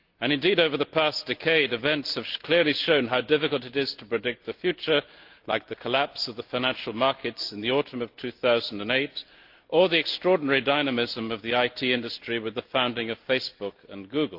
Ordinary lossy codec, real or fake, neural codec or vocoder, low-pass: Opus, 32 kbps; real; none; 5.4 kHz